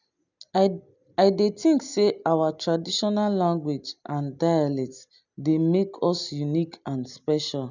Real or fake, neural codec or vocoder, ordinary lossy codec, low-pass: real; none; none; 7.2 kHz